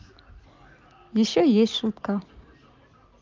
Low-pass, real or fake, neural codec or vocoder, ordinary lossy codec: 7.2 kHz; real; none; Opus, 24 kbps